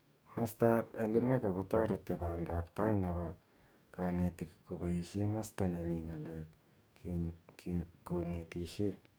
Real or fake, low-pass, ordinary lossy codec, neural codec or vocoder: fake; none; none; codec, 44.1 kHz, 2.6 kbps, DAC